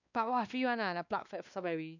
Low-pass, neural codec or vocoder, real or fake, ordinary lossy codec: 7.2 kHz; codec, 16 kHz, 2 kbps, X-Codec, WavLM features, trained on Multilingual LibriSpeech; fake; none